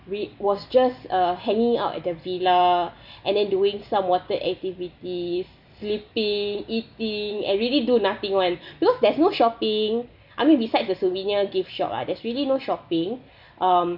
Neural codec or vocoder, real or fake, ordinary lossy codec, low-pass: none; real; none; 5.4 kHz